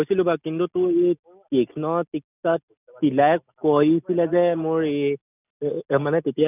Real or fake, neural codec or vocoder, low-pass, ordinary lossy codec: real; none; 3.6 kHz; none